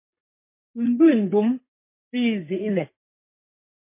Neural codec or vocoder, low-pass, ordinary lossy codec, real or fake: codec, 32 kHz, 1.9 kbps, SNAC; 3.6 kHz; MP3, 24 kbps; fake